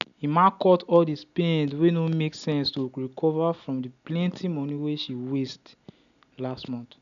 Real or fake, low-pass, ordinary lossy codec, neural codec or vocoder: real; 7.2 kHz; none; none